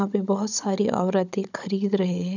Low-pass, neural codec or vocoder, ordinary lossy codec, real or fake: 7.2 kHz; codec, 16 kHz, 16 kbps, FunCodec, trained on Chinese and English, 50 frames a second; none; fake